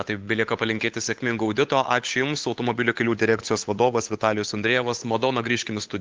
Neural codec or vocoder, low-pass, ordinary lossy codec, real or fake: codec, 16 kHz, 8 kbps, FunCodec, trained on Chinese and English, 25 frames a second; 7.2 kHz; Opus, 32 kbps; fake